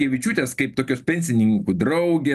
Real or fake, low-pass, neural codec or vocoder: real; 14.4 kHz; none